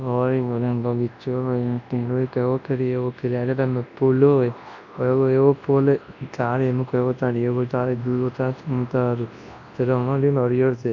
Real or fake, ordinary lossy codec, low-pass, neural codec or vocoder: fake; AAC, 48 kbps; 7.2 kHz; codec, 24 kHz, 0.9 kbps, WavTokenizer, large speech release